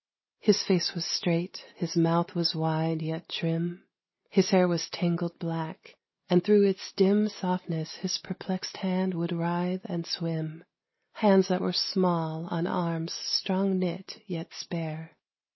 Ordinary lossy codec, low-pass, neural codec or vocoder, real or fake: MP3, 24 kbps; 7.2 kHz; none; real